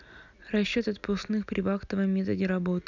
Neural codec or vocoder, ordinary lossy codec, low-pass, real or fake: none; none; 7.2 kHz; real